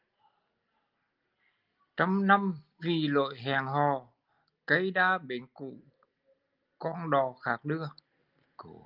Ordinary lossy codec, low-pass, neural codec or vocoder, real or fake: Opus, 32 kbps; 5.4 kHz; none; real